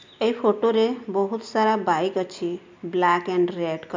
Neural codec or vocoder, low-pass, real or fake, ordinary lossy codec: none; 7.2 kHz; real; MP3, 64 kbps